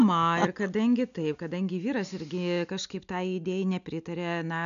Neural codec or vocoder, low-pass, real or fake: none; 7.2 kHz; real